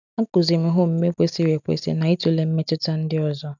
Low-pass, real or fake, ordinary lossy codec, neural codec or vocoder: 7.2 kHz; real; none; none